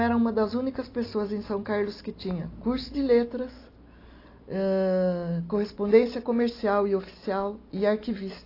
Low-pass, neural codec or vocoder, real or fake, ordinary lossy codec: 5.4 kHz; none; real; AAC, 32 kbps